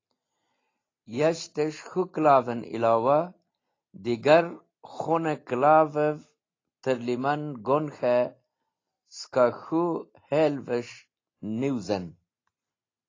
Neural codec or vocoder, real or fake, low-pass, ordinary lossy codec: none; real; 7.2 kHz; AAC, 32 kbps